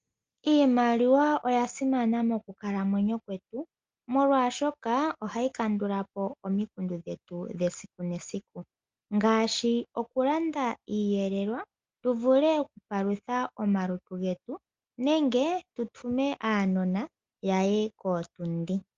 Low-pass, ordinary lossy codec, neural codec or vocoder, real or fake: 7.2 kHz; Opus, 32 kbps; none; real